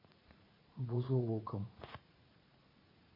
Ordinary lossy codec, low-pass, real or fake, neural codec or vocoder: MP3, 24 kbps; 5.4 kHz; fake; vocoder, 44.1 kHz, 80 mel bands, Vocos